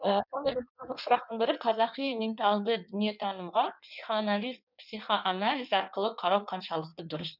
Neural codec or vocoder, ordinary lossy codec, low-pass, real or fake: codec, 16 kHz in and 24 kHz out, 1.1 kbps, FireRedTTS-2 codec; none; 5.4 kHz; fake